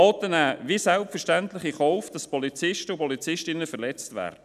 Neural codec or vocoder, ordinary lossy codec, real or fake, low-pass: none; none; real; 14.4 kHz